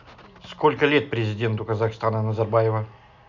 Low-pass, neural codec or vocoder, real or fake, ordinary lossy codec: 7.2 kHz; none; real; none